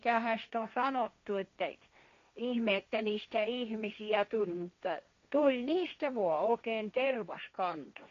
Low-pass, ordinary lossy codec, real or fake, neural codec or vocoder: 7.2 kHz; MP3, 48 kbps; fake; codec, 16 kHz, 1.1 kbps, Voila-Tokenizer